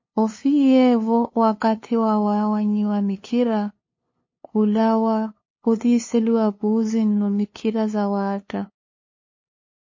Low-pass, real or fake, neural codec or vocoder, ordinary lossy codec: 7.2 kHz; fake; codec, 16 kHz, 2 kbps, FunCodec, trained on LibriTTS, 25 frames a second; MP3, 32 kbps